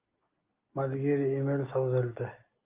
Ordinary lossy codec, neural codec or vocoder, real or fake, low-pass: Opus, 24 kbps; none; real; 3.6 kHz